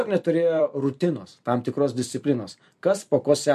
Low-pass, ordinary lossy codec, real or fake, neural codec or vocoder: 14.4 kHz; MP3, 64 kbps; fake; vocoder, 44.1 kHz, 128 mel bands every 256 samples, BigVGAN v2